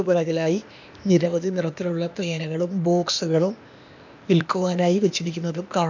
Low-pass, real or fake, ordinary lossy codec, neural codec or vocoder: 7.2 kHz; fake; none; codec, 16 kHz, 0.8 kbps, ZipCodec